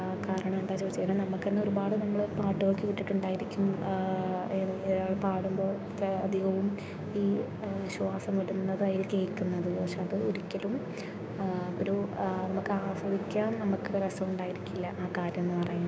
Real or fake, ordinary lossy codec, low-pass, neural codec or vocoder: fake; none; none; codec, 16 kHz, 6 kbps, DAC